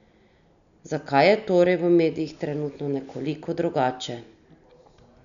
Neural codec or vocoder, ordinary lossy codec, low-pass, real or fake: none; none; 7.2 kHz; real